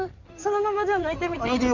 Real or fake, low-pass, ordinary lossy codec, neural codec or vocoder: fake; 7.2 kHz; none; codec, 16 kHz in and 24 kHz out, 2.2 kbps, FireRedTTS-2 codec